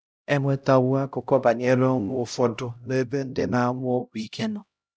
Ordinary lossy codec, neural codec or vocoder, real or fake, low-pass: none; codec, 16 kHz, 0.5 kbps, X-Codec, HuBERT features, trained on LibriSpeech; fake; none